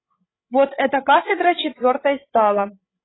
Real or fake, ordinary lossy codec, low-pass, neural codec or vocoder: fake; AAC, 16 kbps; 7.2 kHz; codec, 16 kHz, 16 kbps, FreqCodec, larger model